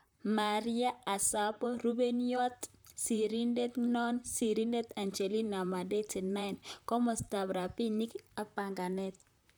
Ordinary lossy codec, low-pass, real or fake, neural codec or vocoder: none; none; fake; vocoder, 44.1 kHz, 128 mel bands, Pupu-Vocoder